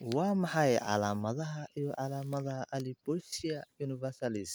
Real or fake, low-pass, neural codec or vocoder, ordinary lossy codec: real; none; none; none